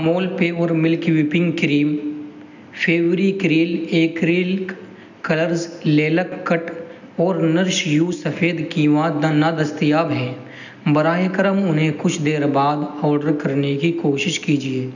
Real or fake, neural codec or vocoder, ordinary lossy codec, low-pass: real; none; none; 7.2 kHz